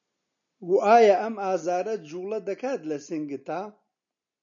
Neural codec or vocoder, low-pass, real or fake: none; 7.2 kHz; real